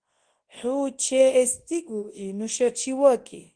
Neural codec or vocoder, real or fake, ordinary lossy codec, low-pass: codec, 24 kHz, 0.9 kbps, WavTokenizer, large speech release; fake; Opus, 16 kbps; 9.9 kHz